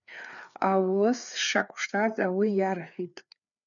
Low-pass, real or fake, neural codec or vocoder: 7.2 kHz; fake; codec, 16 kHz, 4 kbps, FreqCodec, larger model